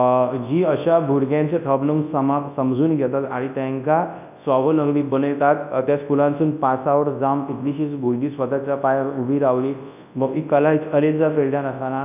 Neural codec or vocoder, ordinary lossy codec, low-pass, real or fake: codec, 24 kHz, 0.9 kbps, WavTokenizer, large speech release; none; 3.6 kHz; fake